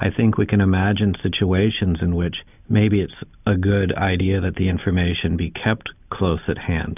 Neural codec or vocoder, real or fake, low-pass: none; real; 3.6 kHz